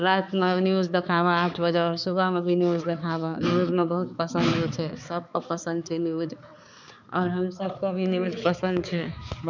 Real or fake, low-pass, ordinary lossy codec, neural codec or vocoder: fake; 7.2 kHz; none; codec, 16 kHz, 4 kbps, X-Codec, HuBERT features, trained on balanced general audio